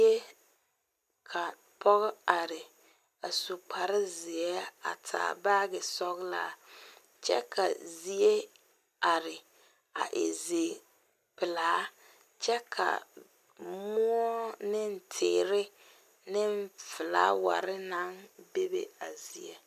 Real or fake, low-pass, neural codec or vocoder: real; 14.4 kHz; none